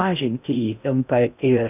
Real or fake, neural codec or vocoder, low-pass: fake; codec, 16 kHz in and 24 kHz out, 0.6 kbps, FocalCodec, streaming, 2048 codes; 3.6 kHz